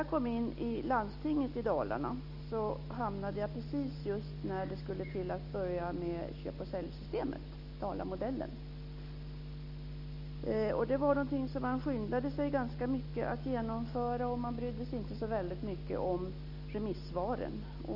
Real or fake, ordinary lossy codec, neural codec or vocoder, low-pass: real; none; none; 5.4 kHz